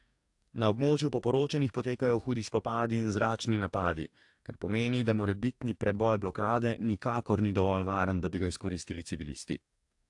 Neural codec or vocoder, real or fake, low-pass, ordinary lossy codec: codec, 44.1 kHz, 2.6 kbps, DAC; fake; 10.8 kHz; AAC, 64 kbps